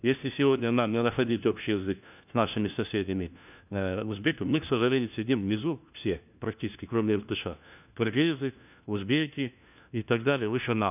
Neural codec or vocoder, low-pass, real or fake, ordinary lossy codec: codec, 16 kHz, 1 kbps, FunCodec, trained on LibriTTS, 50 frames a second; 3.6 kHz; fake; none